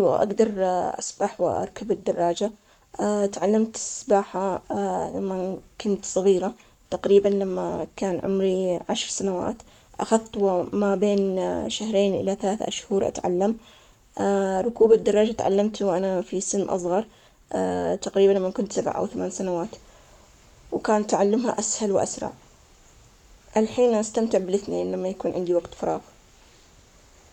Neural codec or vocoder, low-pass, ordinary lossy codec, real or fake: codec, 44.1 kHz, 7.8 kbps, Pupu-Codec; 19.8 kHz; none; fake